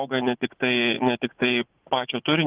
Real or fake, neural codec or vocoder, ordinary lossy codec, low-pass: fake; vocoder, 44.1 kHz, 80 mel bands, Vocos; Opus, 16 kbps; 3.6 kHz